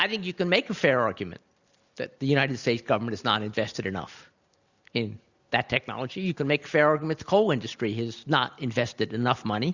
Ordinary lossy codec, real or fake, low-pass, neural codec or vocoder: Opus, 64 kbps; real; 7.2 kHz; none